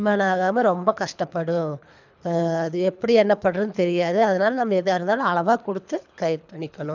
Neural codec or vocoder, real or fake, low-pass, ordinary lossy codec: codec, 24 kHz, 3 kbps, HILCodec; fake; 7.2 kHz; none